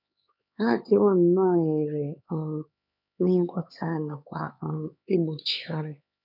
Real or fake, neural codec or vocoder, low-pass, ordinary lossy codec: fake; codec, 16 kHz, 4 kbps, X-Codec, HuBERT features, trained on LibriSpeech; 5.4 kHz; none